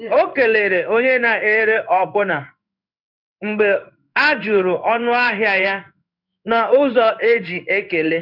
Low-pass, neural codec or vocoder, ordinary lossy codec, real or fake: 5.4 kHz; codec, 16 kHz in and 24 kHz out, 1 kbps, XY-Tokenizer; none; fake